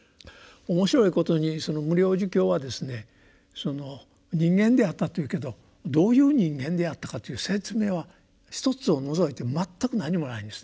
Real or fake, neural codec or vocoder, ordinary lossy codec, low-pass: real; none; none; none